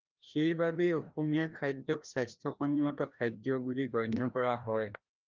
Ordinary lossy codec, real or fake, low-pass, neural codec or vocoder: Opus, 32 kbps; fake; 7.2 kHz; codec, 16 kHz, 1 kbps, FreqCodec, larger model